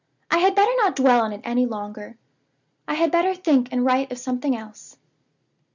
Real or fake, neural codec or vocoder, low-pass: real; none; 7.2 kHz